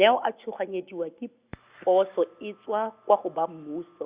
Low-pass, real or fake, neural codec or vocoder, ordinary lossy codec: 3.6 kHz; real; none; Opus, 64 kbps